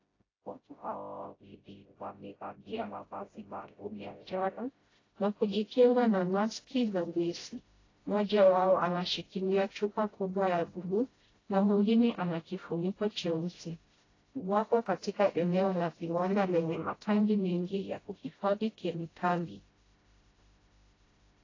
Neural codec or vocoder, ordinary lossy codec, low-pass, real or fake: codec, 16 kHz, 0.5 kbps, FreqCodec, smaller model; AAC, 32 kbps; 7.2 kHz; fake